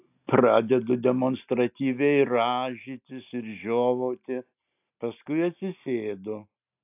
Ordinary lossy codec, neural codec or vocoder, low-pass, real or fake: AAC, 32 kbps; none; 3.6 kHz; real